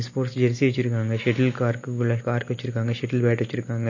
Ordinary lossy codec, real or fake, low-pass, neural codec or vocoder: MP3, 32 kbps; real; 7.2 kHz; none